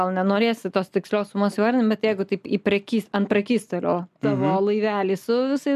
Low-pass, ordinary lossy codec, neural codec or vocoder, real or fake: 14.4 kHz; MP3, 96 kbps; none; real